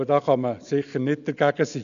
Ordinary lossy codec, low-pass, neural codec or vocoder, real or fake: none; 7.2 kHz; none; real